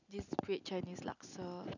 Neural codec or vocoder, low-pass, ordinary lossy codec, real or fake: none; 7.2 kHz; none; real